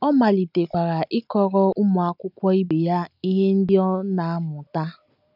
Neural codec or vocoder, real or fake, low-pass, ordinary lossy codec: none; real; 5.4 kHz; none